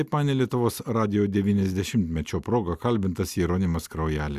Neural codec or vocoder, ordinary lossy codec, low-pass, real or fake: none; Opus, 64 kbps; 14.4 kHz; real